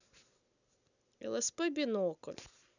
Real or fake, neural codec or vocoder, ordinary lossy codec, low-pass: real; none; none; 7.2 kHz